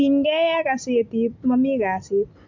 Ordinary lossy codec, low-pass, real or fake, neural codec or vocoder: MP3, 64 kbps; 7.2 kHz; real; none